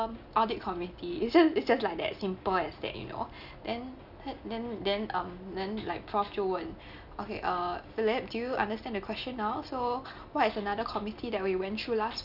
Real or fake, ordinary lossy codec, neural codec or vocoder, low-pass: real; none; none; 5.4 kHz